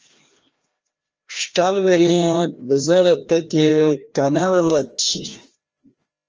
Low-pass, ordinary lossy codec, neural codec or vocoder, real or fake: 7.2 kHz; Opus, 24 kbps; codec, 16 kHz, 1 kbps, FreqCodec, larger model; fake